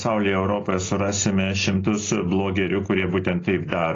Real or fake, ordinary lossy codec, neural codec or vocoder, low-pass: real; AAC, 32 kbps; none; 7.2 kHz